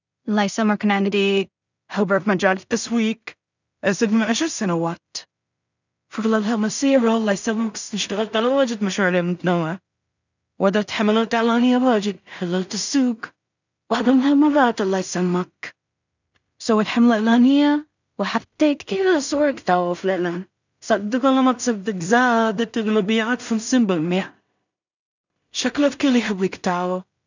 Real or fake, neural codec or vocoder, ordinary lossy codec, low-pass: fake; codec, 16 kHz in and 24 kHz out, 0.4 kbps, LongCat-Audio-Codec, two codebook decoder; none; 7.2 kHz